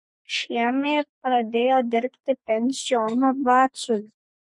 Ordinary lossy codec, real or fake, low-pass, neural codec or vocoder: MP3, 48 kbps; fake; 10.8 kHz; codec, 44.1 kHz, 2.6 kbps, SNAC